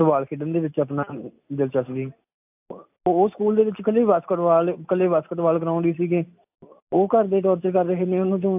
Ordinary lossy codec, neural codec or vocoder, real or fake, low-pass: AAC, 32 kbps; none; real; 3.6 kHz